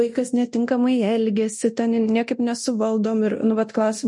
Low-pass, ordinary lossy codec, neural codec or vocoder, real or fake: 10.8 kHz; MP3, 48 kbps; codec, 24 kHz, 0.9 kbps, DualCodec; fake